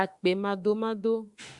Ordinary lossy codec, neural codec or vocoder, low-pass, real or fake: Opus, 64 kbps; autoencoder, 48 kHz, 32 numbers a frame, DAC-VAE, trained on Japanese speech; 10.8 kHz; fake